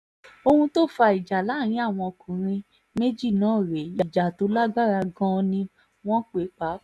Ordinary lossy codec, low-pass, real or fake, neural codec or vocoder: none; none; real; none